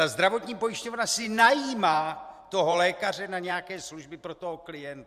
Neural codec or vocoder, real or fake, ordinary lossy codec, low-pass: vocoder, 44.1 kHz, 128 mel bands every 512 samples, BigVGAN v2; fake; Opus, 64 kbps; 14.4 kHz